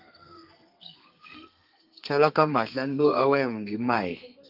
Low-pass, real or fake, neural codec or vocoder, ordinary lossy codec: 5.4 kHz; fake; codec, 32 kHz, 1.9 kbps, SNAC; Opus, 24 kbps